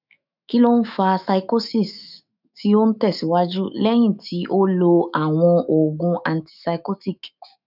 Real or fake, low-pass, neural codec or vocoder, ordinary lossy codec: fake; 5.4 kHz; autoencoder, 48 kHz, 128 numbers a frame, DAC-VAE, trained on Japanese speech; none